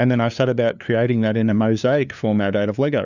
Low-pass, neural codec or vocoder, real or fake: 7.2 kHz; codec, 16 kHz, 2 kbps, FunCodec, trained on LibriTTS, 25 frames a second; fake